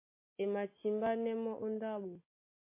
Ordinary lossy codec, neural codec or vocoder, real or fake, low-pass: MP3, 24 kbps; none; real; 3.6 kHz